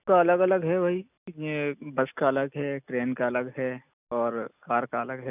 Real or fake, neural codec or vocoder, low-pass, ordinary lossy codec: real; none; 3.6 kHz; none